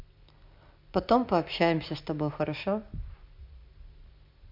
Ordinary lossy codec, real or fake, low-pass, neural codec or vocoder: MP3, 48 kbps; real; 5.4 kHz; none